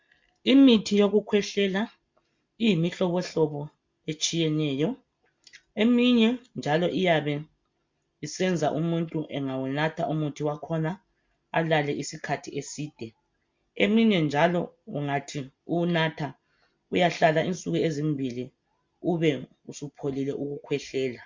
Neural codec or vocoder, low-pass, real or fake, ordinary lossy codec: none; 7.2 kHz; real; MP3, 48 kbps